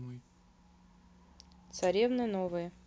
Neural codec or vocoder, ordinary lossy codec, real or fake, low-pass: none; none; real; none